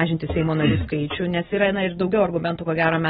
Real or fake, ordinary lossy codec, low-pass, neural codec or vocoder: real; AAC, 16 kbps; 19.8 kHz; none